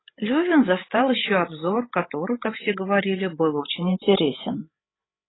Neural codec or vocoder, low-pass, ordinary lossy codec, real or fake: codec, 16 kHz, 16 kbps, FreqCodec, larger model; 7.2 kHz; AAC, 16 kbps; fake